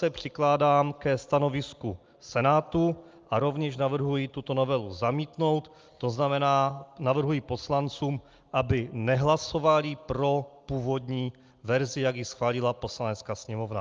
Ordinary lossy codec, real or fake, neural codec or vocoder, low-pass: Opus, 24 kbps; real; none; 7.2 kHz